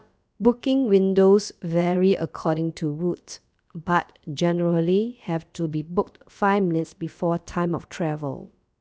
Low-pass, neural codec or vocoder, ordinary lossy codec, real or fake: none; codec, 16 kHz, about 1 kbps, DyCAST, with the encoder's durations; none; fake